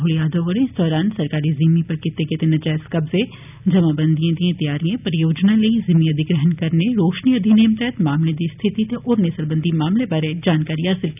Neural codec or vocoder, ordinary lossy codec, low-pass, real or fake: none; none; 3.6 kHz; real